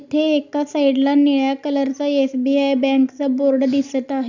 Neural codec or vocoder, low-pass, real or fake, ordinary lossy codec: none; 7.2 kHz; real; none